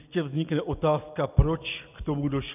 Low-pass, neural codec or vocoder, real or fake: 3.6 kHz; none; real